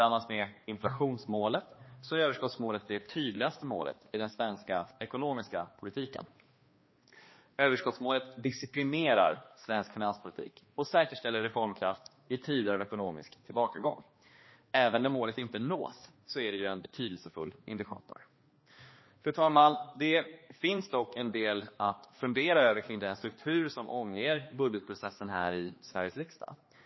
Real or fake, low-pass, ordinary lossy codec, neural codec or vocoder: fake; 7.2 kHz; MP3, 24 kbps; codec, 16 kHz, 2 kbps, X-Codec, HuBERT features, trained on balanced general audio